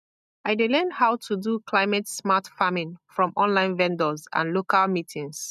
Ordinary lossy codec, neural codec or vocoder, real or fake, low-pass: none; none; real; 14.4 kHz